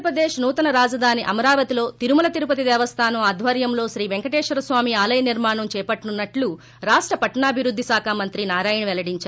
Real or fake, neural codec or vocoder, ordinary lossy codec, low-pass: real; none; none; none